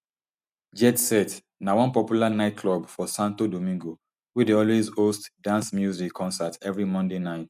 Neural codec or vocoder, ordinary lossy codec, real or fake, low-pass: none; none; real; 14.4 kHz